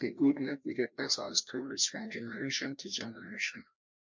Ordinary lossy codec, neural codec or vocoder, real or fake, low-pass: MP3, 48 kbps; codec, 16 kHz, 1 kbps, FreqCodec, larger model; fake; 7.2 kHz